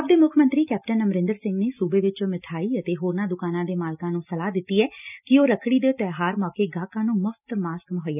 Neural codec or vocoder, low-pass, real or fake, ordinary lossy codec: none; 3.6 kHz; real; none